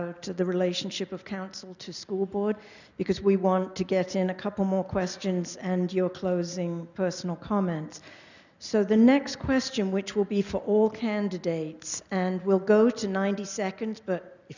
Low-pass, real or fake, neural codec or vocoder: 7.2 kHz; real; none